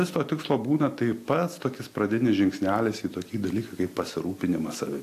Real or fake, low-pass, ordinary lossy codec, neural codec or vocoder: real; 14.4 kHz; AAC, 64 kbps; none